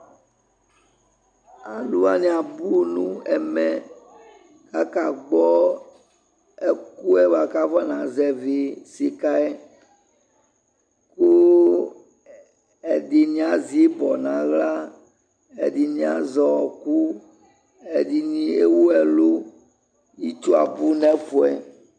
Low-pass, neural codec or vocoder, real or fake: 9.9 kHz; none; real